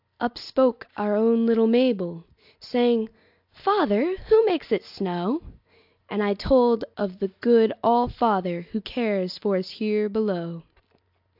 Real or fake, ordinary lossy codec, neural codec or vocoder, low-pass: real; AAC, 48 kbps; none; 5.4 kHz